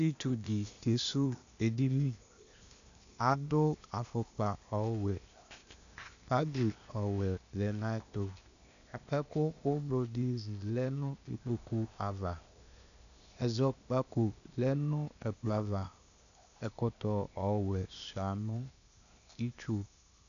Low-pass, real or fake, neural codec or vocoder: 7.2 kHz; fake; codec, 16 kHz, 0.8 kbps, ZipCodec